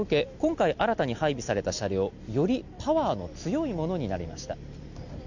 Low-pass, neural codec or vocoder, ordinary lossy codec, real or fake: 7.2 kHz; none; none; real